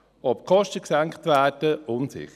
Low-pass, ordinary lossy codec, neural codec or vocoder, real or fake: 14.4 kHz; none; none; real